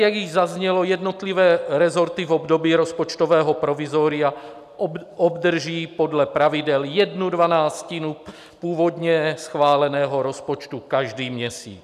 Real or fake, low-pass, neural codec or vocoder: real; 14.4 kHz; none